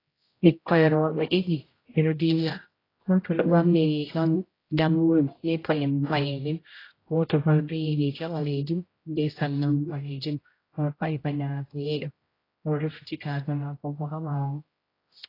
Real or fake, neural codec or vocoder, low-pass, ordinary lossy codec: fake; codec, 16 kHz, 0.5 kbps, X-Codec, HuBERT features, trained on general audio; 5.4 kHz; AAC, 24 kbps